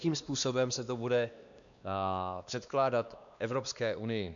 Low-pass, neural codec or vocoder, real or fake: 7.2 kHz; codec, 16 kHz, 2 kbps, X-Codec, WavLM features, trained on Multilingual LibriSpeech; fake